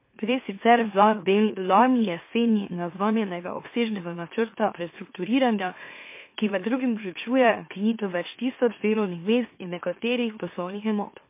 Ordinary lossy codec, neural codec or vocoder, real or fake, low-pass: MP3, 24 kbps; autoencoder, 44.1 kHz, a latent of 192 numbers a frame, MeloTTS; fake; 3.6 kHz